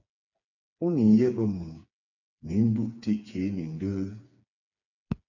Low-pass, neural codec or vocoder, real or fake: 7.2 kHz; codec, 16 kHz, 4 kbps, FreqCodec, smaller model; fake